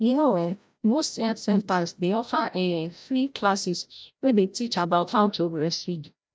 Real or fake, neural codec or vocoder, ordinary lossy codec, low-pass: fake; codec, 16 kHz, 0.5 kbps, FreqCodec, larger model; none; none